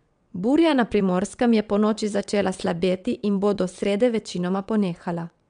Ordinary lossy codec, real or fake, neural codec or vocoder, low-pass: MP3, 96 kbps; fake; vocoder, 22.05 kHz, 80 mel bands, WaveNeXt; 9.9 kHz